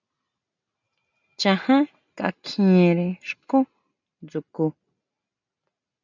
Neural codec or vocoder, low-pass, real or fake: none; 7.2 kHz; real